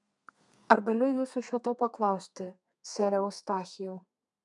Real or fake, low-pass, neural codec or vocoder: fake; 10.8 kHz; codec, 32 kHz, 1.9 kbps, SNAC